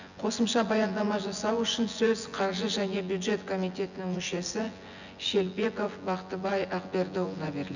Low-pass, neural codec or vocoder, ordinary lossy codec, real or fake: 7.2 kHz; vocoder, 24 kHz, 100 mel bands, Vocos; none; fake